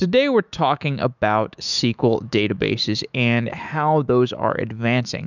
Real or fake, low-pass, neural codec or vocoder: fake; 7.2 kHz; autoencoder, 48 kHz, 128 numbers a frame, DAC-VAE, trained on Japanese speech